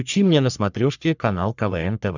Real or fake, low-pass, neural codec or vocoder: fake; 7.2 kHz; codec, 44.1 kHz, 3.4 kbps, Pupu-Codec